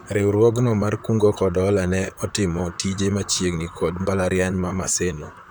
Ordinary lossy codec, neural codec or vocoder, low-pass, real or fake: none; vocoder, 44.1 kHz, 128 mel bands, Pupu-Vocoder; none; fake